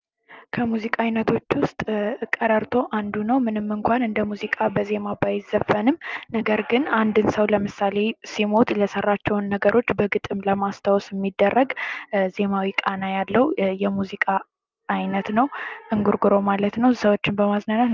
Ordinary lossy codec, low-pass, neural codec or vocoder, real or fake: Opus, 24 kbps; 7.2 kHz; none; real